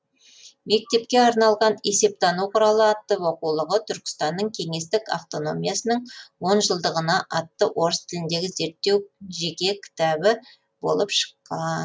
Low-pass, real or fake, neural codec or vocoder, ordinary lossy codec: none; real; none; none